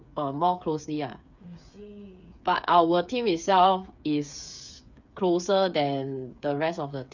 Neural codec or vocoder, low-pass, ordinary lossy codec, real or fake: codec, 16 kHz, 8 kbps, FreqCodec, smaller model; 7.2 kHz; none; fake